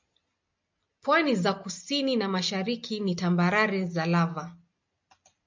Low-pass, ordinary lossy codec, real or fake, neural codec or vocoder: 7.2 kHz; MP3, 64 kbps; real; none